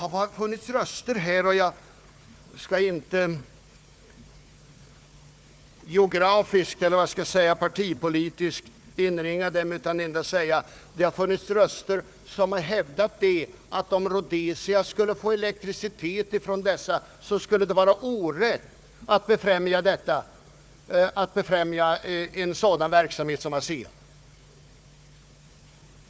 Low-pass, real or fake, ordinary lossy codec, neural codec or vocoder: none; fake; none; codec, 16 kHz, 4 kbps, FunCodec, trained on Chinese and English, 50 frames a second